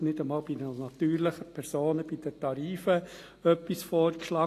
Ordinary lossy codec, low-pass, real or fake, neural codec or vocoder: AAC, 48 kbps; 14.4 kHz; real; none